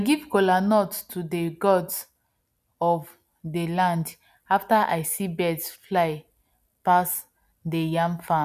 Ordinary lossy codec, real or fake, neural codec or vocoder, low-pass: none; real; none; 14.4 kHz